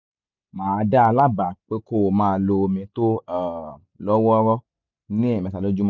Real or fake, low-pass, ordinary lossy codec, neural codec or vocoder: real; 7.2 kHz; none; none